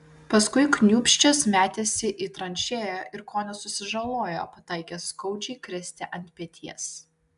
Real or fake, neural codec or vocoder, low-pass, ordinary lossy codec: real; none; 10.8 kHz; AAC, 96 kbps